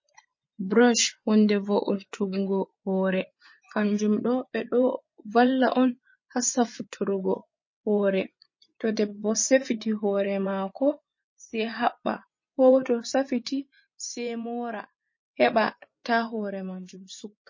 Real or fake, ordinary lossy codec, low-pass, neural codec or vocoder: fake; MP3, 32 kbps; 7.2 kHz; vocoder, 24 kHz, 100 mel bands, Vocos